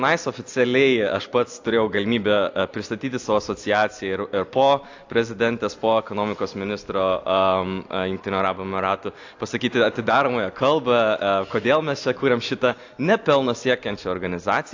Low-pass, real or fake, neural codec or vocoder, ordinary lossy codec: 7.2 kHz; real; none; AAC, 48 kbps